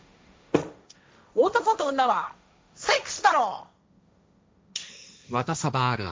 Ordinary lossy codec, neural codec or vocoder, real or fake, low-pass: none; codec, 16 kHz, 1.1 kbps, Voila-Tokenizer; fake; none